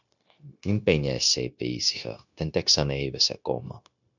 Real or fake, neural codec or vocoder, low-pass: fake; codec, 16 kHz, 0.9 kbps, LongCat-Audio-Codec; 7.2 kHz